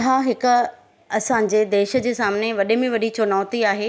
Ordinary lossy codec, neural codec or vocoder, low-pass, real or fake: none; none; none; real